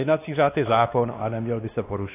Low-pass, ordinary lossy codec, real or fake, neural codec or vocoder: 3.6 kHz; AAC, 16 kbps; fake; codec, 16 kHz, 2 kbps, X-Codec, WavLM features, trained on Multilingual LibriSpeech